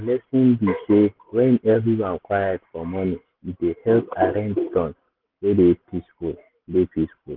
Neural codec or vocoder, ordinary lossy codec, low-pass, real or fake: none; Opus, 16 kbps; 5.4 kHz; real